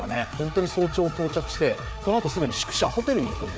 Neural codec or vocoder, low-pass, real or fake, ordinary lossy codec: codec, 16 kHz, 4 kbps, FreqCodec, larger model; none; fake; none